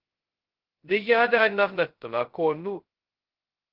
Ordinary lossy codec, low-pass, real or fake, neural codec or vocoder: Opus, 16 kbps; 5.4 kHz; fake; codec, 16 kHz, 0.2 kbps, FocalCodec